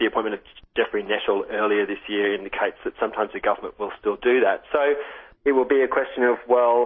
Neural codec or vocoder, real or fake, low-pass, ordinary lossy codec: none; real; 7.2 kHz; MP3, 24 kbps